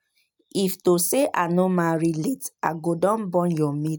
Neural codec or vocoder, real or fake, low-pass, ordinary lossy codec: none; real; none; none